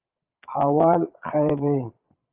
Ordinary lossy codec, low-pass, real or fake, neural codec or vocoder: Opus, 32 kbps; 3.6 kHz; fake; vocoder, 22.05 kHz, 80 mel bands, Vocos